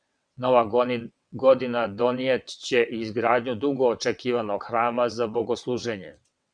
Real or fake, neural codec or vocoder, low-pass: fake; vocoder, 22.05 kHz, 80 mel bands, WaveNeXt; 9.9 kHz